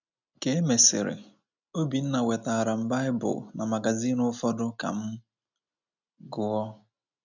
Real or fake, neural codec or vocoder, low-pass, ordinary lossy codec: real; none; 7.2 kHz; none